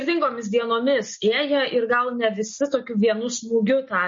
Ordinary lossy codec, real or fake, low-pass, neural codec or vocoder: MP3, 32 kbps; real; 7.2 kHz; none